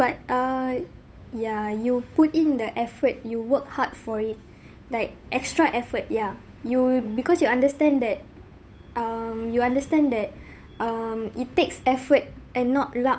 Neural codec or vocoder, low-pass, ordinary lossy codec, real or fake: codec, 16 kHz, 8 kbps, FunCodec, trained on Chinese and English, 25 frames a second; none; none; fake